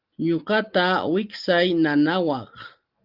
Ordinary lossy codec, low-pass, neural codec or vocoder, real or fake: Opus, 32 kbps; 5.4 kHz; none; real